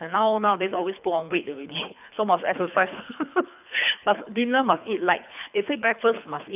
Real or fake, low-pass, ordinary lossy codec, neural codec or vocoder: fake; 3.6 kHz; none; codec, 24 kHz, 3 kbps, HILCodec